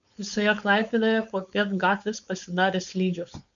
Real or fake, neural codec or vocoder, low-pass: fake; codec, 16 kHz, 4.8 kbps, FACodec; 7.2 kHz